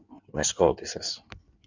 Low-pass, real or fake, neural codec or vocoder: 7.2 kHz; fake; codec, 16 kHz in and 24 kHz out, 1.1 kbps, FireRedTTS-2 codec